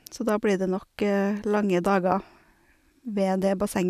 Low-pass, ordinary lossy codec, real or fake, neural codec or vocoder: 14.4 kHz; none; real; none